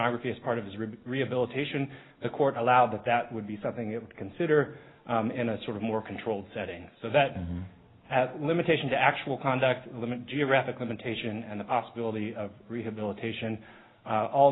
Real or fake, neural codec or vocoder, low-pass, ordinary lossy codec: real; none; 7.2 kHz; AAC, 16 kbps